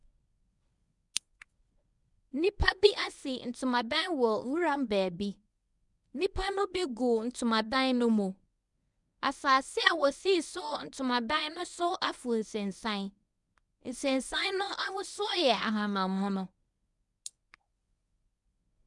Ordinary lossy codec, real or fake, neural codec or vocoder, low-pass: none; fake; codec, 24 kHz, 0.9 kbps, WavTokenizer, medium speech release version 1; 10.8 kHz